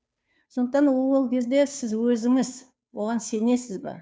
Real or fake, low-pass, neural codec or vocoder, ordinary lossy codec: fake; none; codec, 16 kHz, 2 kbps, FunCodec, trained on Chinese and English, 25 frames a second; none